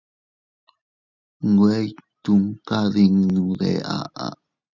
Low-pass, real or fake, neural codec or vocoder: 7.2 kHz; real; none